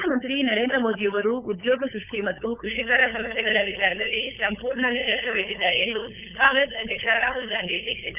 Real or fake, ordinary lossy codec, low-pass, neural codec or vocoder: fake; none; 3.6 kHz; codec, 16 kHz, 8 kbps, FunCodec, trained on LibriTTS, 25 frames a second